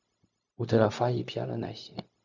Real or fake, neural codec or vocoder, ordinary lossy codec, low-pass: fake; codec, 16 kHz, 0.4 kbps, LongCat-Audio-Codec; Opus, 64 kbps; 7.2 kHz